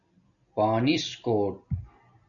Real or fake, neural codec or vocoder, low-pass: real; none; 7.2 kHz